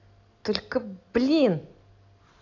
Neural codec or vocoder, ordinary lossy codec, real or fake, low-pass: none; AAC, 48 kbps; real; 7.2 kHz